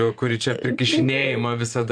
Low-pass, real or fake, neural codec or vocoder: 9.9 kHz; fake; vocoder, 24 kHz, 100 mel bands, Vocos